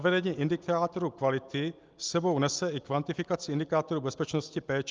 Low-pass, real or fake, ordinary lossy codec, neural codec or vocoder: 7.2 kHz; real; Opus, 24 kbps; none